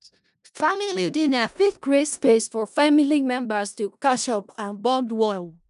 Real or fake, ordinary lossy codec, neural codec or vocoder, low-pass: fake; none; codec, 16 kHz in and 24 kHz out, 0.4 kbps, LongCat-Audio-Codec, four codebook decoder; 10.8 kHz